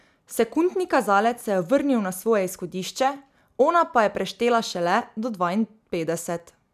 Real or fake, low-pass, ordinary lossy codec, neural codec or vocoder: real; 14.4 kHz; none; none